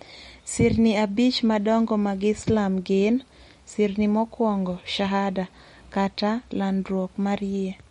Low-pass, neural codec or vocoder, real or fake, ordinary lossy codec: 19.8 kHz; none; real; MP3, 48 kbps